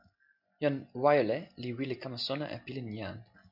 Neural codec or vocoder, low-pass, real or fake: none; 5.4 kHz; real